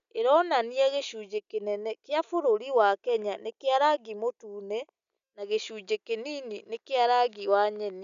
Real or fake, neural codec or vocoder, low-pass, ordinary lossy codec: real; none; 7.2 kHz; none